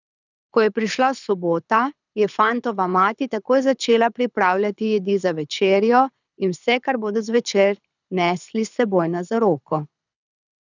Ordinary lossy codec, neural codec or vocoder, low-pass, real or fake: none; codec, 24 kHz, 6 kbps, HILCodec; 7.2 kHz; fake